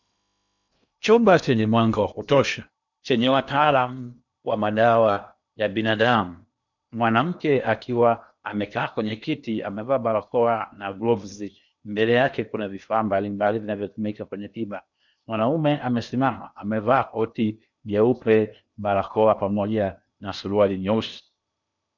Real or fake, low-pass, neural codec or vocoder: fake; 7.2 kHz; codec, 16 kHz in and 24 kHz out, 0.8 kbps, FocalCodec, streaming, 65536 codes